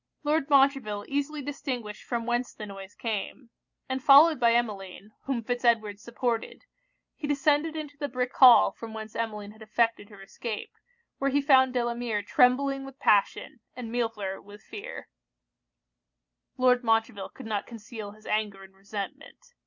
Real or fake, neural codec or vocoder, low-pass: real; none; 7.2 kHz